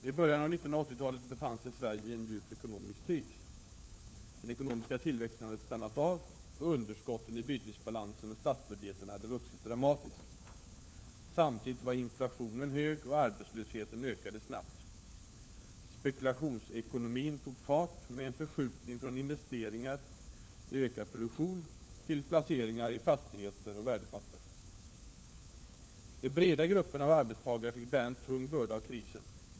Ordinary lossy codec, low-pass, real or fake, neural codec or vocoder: none; none; fake; codec, 16 kHz, 4 kbps, FunCodec, trained on LibriTTS, 50 frames a second